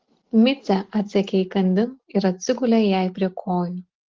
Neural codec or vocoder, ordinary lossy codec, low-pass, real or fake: none; Opus, 16 kbps; 7.2 kHz; real